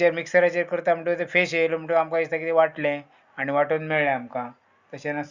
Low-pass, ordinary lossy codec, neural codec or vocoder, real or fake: 7.2 kHz; Opus, 64 kbps; none; real